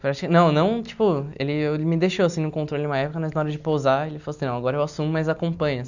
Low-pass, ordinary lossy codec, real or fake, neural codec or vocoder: 7.2 kHz; none; real; none